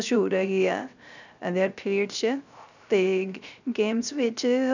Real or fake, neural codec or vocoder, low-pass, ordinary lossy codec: fake; codec, 16 kHz, 0.3 kbps, FocalCodec; 7.2 kHz; none